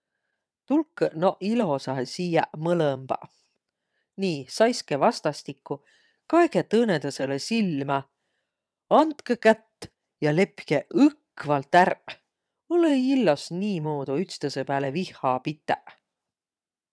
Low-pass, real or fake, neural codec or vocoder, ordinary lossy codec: none; fake; vocoder, 22.05 kHz, 80 mel bands, WaveNeXt; none